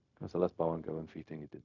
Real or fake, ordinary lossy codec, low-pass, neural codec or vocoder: fake; none; 7.2 kHz; codec, 16 kHz, 0.4 kbps, LongCat-Audio-Codec